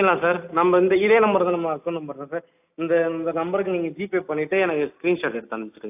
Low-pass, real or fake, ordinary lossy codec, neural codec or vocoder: 3.6 kHz; real; AAC, 32 kbps; none